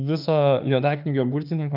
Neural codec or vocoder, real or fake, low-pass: autoencoder, 48 kHz, 32 numbers a frame, DAC-VAE, trained on Japanese speech; fake; 5.4 kHz